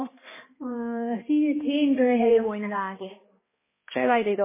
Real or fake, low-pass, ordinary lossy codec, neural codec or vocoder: fake; 3.6 kHz; MP3, 16 kbps; codec, 16 kHz, 1 kbps, X-Codec, HuBERT features, trained on balanced general audio